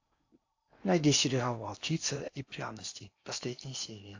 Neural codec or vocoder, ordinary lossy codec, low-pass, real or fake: codec, 16 kHz in and 24 kHz out, 0.8 kbps, FocalCodec, streaming, 65536 codes; AAC, 48 kbps; 7.2 kHz; fake